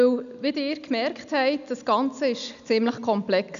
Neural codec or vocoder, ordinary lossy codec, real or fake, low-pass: none; none; real; 7.2 kHz